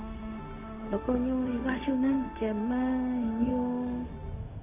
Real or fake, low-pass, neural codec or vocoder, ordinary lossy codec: fake; 3.6 kHz; codec, 16 kHz, 0.4 kbps, LongCat-Audio-Codec; none